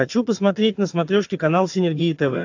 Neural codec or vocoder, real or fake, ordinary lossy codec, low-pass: vocoder, 22.05 kHz, 80 mel bands, HiFi-GAN; fake; AAC, 48 kbps; 7.2 kHz